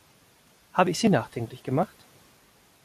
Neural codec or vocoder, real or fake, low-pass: none; real; 14.4 kHz